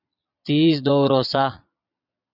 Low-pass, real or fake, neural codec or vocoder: 5.4 kHz; fake; vocoder, 22.05 kHz, 80 mel bands, Vocos